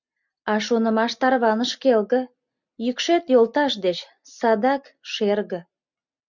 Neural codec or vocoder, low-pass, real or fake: none; 7.2 kHz; real